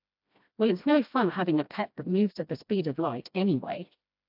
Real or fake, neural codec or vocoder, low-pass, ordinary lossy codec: fake; codec, 16 kHz, 1 kbps, FreqCodec, smaller model; 5.4 kHz; AAC, 48 kbps